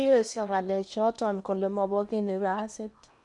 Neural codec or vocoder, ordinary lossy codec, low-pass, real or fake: codec, 16 kHz in and 24 kHz out, 0.8 kbps, FocalCodec, streaming, 65536 codes; none; 10.8 kHz; fake